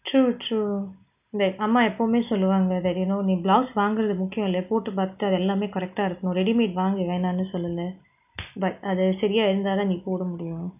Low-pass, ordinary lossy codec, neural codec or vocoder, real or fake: 3.6 kHz; none; none; real